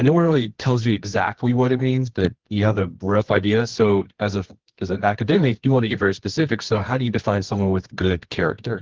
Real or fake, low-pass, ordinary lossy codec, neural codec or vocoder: fake; 7.2 kHz; Opus, 16 kbps; codec, 24 kHz, 0.9 kbps, WavTokenizer, medium music audio release